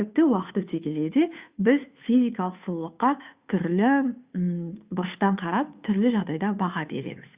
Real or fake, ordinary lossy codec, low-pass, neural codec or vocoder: fake; Opus, 64 kbps; 3.6 kHz; codec, 16 kHz, 2 kbps, FunCodec, trained on Chinese and English, 25 frames a second